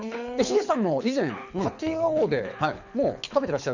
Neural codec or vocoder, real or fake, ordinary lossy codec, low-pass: codec, 24 kHz, 6 kbps, HILCodec; fake; none; 7.2 kHz